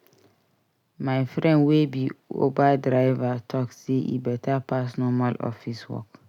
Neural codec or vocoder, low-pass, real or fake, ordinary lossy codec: none; 19.8 kHz; real; none